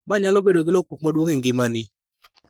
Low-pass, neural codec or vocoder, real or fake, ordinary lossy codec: none; codec, 44.1 kHz, 3.4 kbps, Pupu-Codec; fake; none